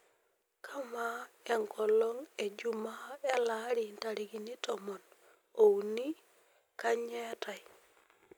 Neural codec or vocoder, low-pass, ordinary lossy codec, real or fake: none; none; none; real